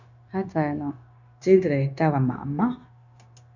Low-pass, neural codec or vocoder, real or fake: 7.2 kHz; codec, 16 kHz, 0.9 kbps, LongCat-Audio-Codec; fake